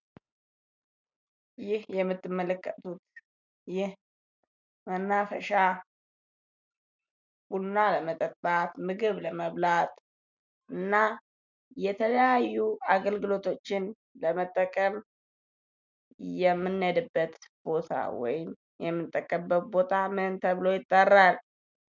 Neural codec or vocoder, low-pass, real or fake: none; 7.2 kHz; real